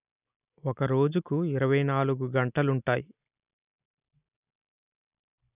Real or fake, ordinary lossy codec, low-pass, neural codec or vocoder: real; none; 3.6 kHz; none